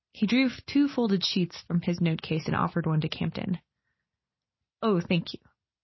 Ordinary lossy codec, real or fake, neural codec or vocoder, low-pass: MP3, 24 kbps; real; none; 7.2 kHz